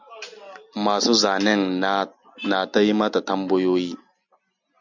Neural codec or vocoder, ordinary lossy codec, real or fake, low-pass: none; MP3, 64 kbps; real; 7.2 kHz